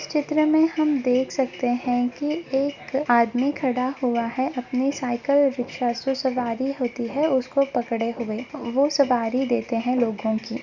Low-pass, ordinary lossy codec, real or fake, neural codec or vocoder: 7.2 kHz; Opus, 64 kbps; real; none